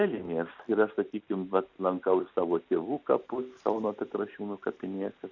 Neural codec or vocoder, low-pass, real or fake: none; 7.2 kHz; real